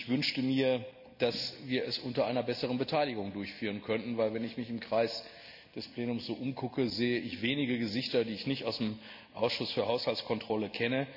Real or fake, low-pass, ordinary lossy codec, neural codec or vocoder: real; 5.4 kHz; none; none